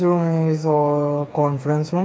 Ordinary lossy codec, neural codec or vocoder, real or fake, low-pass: none; codec, 16 kHz, 4 kbps, FreqCodec, smaller model; fake; none